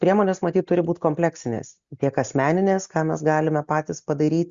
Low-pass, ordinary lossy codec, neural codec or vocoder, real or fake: 7.2 kHz; Opus, 64 kbps; none; real